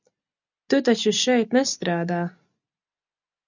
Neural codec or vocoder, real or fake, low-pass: none; real; 7.2 kHz